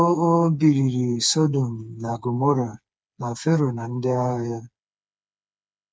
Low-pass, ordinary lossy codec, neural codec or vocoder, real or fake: none; none; codec, 16 kHz, 4 kbps, FreqCodec, smaller model; fake